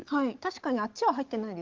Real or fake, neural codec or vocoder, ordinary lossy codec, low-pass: real; none; Opus, 16 kbps; 7.2 kHz